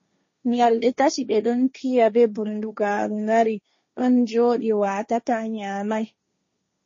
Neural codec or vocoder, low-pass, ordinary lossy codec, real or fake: codec, 16 kHz, 1.1 kbps, Voila-Tokenizer; 7.2 kHz; MP3, 32 kbps; fake